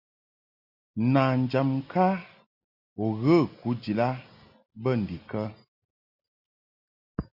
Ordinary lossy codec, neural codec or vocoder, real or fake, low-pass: Opus, 64 kbps; none; real; 5.4 kHz